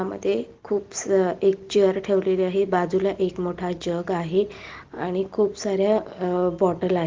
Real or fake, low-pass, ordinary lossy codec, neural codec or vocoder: real; 7.2 kHz; Opus, 16 kbps; none